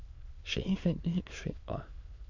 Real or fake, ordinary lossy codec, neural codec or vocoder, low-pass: fake; MP3, 48 kbps; autoencoder, 22.05 kHz, a latent of 192 numbers a frame, VITS, trained on many speakers; 7.2 kHz